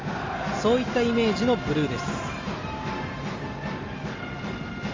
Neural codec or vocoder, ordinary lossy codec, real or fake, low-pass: none; Opus, 32 kbps; real; 7.2 kHz